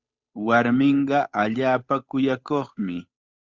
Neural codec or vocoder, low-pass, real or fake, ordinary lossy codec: codec, 16 kHz, 8 kbps, FunCodec, trained on Chinese and English, 25 frames a second; 7.2 kHz; fake; Opus, 64 kbps